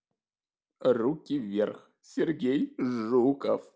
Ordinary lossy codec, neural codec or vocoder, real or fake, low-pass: none; none; real; none